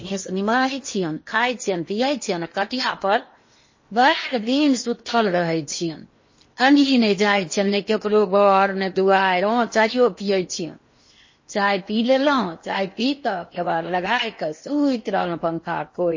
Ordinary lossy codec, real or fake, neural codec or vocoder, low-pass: MP3, 32 kbps; fake; codec, 16 kHz in and 24 kHz out, 0.8 kbps, FocalCodec, streaming, 65536 codes; 7.2 kHz